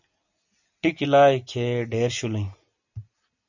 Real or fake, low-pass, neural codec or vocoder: real; 7.2 kHz; none